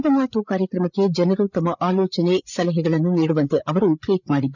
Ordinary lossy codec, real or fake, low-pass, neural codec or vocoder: none; fake; 7.2 kHz; codec, 16 kHz, 8 kbps, FreqCodec, larger model